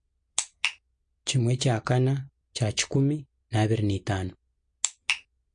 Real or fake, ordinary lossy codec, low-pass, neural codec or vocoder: real; MP3, 48 kbps; 9.9 kHz; none